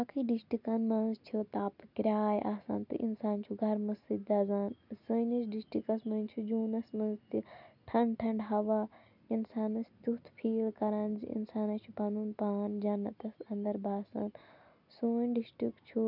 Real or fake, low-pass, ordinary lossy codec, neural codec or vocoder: real; 5.4 kHz; none; none